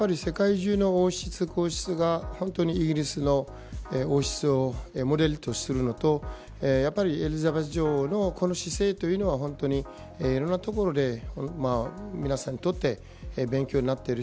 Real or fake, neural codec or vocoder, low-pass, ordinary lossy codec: real; none; none; none